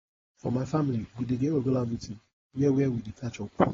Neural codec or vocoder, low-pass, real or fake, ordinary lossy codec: codec, 16 kHz, 4.8 kbps, FACodec; 7.2 kHz; fake; AAC, 24 kbps